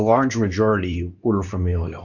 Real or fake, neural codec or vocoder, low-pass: fake; codec, 24 kHz, 0.9 kbps, WavTokenizer, medium speech release version 2; 7.2 kHz